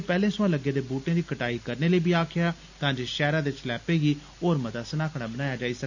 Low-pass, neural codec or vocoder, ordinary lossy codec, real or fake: 7.2 kHz; none; none; real